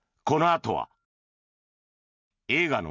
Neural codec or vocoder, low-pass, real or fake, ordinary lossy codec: none; 7.2 kHz; real; none